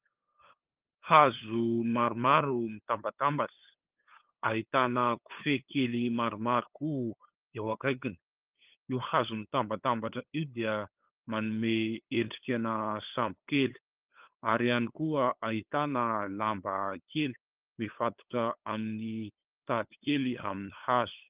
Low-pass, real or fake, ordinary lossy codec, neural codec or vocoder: 3.6 kHz; fake; Opus, 32 kbps; codec, 16 kHz, 8 kbps, FunCodec, trained on LibriTTS, 25 frames a second